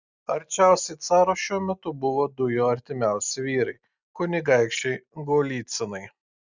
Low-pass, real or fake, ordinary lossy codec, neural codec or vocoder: 7.2 kHz; real; Opus, 64 kbps; none